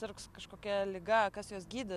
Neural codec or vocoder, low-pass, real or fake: none; 14.4 kHz; real